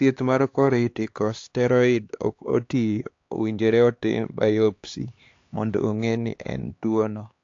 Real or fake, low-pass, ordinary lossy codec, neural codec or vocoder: fake; 7.2 kHz; AAC, 48 kbps; codec, 16 kHz, 2 kbps, X-Codec, HuBERT features, trained on LibriSpeech